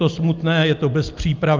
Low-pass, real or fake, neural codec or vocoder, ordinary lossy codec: 7.2 kHz; real; none; Opus, 32 kbps